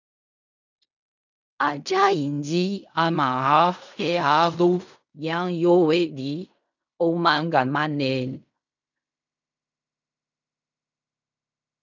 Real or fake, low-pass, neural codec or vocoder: fake; 7.2 kHz; codec, 16 kHz in and 24 kHz out, 0.4 kbps, LongCat-Audio-Codec, fine tuned four codebook decoder